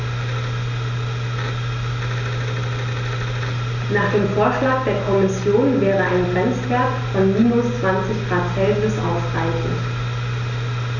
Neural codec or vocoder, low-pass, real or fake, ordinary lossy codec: none; 7.2 kHz; real; none